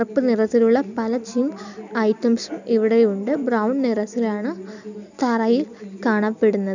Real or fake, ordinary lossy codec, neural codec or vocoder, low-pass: real; none; none; 7.2 kHz